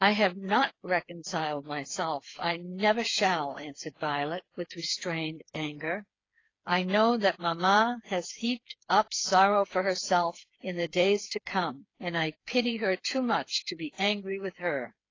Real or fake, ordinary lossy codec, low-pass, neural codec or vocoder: fake; AAC, 32 kbps; 7.2 kHz; codec, 16 kHz, 4 kbps, FreqCodec, smaller model